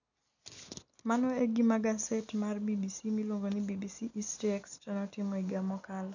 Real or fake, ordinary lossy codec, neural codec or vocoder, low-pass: real; none; none; 7.2 kHz